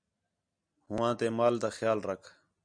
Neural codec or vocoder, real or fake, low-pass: none; real; 9.9 kHz